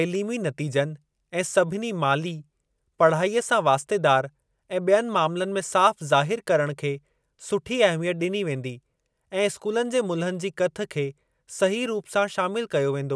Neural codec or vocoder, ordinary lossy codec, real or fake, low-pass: none; none; real; none